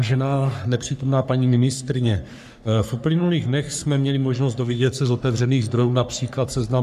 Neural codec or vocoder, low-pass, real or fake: codec, 44.1 kHz, 3.4 kbps, Pupu-Codec; 14.4 kHz; fake